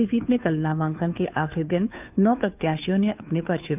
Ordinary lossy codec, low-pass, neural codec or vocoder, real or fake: none; 3.6 kHz; codec, 16 kHz, 2 kbps, FunCodec, trained on Chinese and English, 25 frames a second; fake